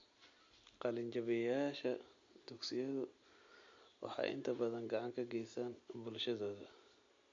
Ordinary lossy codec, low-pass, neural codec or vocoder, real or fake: MP3, 48 kbps; 7.2 kHz; none; real